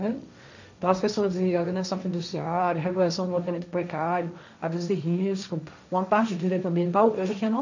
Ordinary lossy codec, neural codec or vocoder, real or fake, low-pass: none; codec, 16 kHz, 1.1 kbps, Voila-Tokenizer; fake; 7.2 kHz